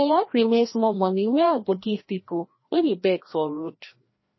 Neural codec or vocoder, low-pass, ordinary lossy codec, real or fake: codec, 16 kHz, 1 kbps, FreqCodec, larger model; 7.2 kHz; MP3, 24 kbps; fake